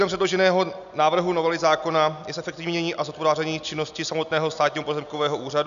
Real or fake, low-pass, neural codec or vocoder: real; 7.2 kHz; none